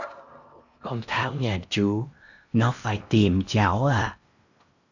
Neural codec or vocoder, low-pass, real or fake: codec, 16 kHz in and 24 kHz out, 0.8 kbps, FocalCodec, streaming, 65536 codes; 7.2 kHz; fake